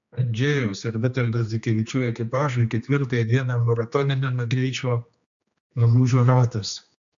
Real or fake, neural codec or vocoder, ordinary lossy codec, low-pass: fake; codec, 16 kHz, 2 kbps, X-Codec, HuBERT features, trained on general audio; MP3, 64 kbps; 7.2 kHz